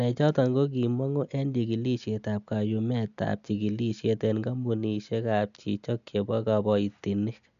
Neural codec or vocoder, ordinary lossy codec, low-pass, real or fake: none; none; 7.2 kHz; real